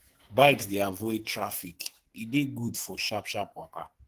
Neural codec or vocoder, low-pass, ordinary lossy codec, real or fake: codec, 44.1 kHz, 2.6 kbps, SNAC; 14.4 kHz; Opus, 16 kbps; fake